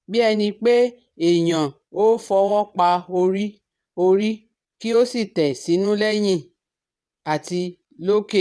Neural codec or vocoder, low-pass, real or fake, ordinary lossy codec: vocoder, 22.05 kHz, 80 mel bands, WaveNeXt; none; fake; none